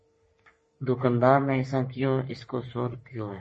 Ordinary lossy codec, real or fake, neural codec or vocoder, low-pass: MP3, 32 kbps; fake; codec, 44.1 kHz, 3.4 kbps, Pupu-Codec; 10.8 kHz